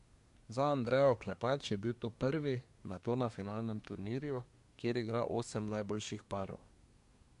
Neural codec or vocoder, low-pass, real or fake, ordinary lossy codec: codec, 24 kHz, 1 kbps, SNAC; 10.8 kHz; fake; none